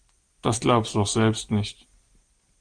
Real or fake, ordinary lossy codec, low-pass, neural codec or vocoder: real; Opus, 24 kbps; 9.9 kHz; none